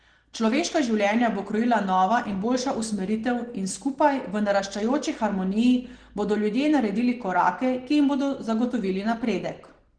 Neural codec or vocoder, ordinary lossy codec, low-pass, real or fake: none; Opus, 16 kbps; 9.9 kHz; real